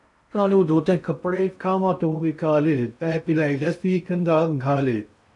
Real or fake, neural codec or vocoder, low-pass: fake; codec, 16 kHz in and 24 kHz out, 0.6 kbps, FocalCodec, streaming, 4096 codes; 10.8 kHz